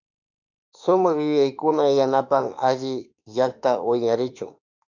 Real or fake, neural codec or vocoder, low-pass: fake; autoencoder, 48 kHz, 32 numbers a frame, DAC-VAE, trained on Japanese speech; 7.2 kHz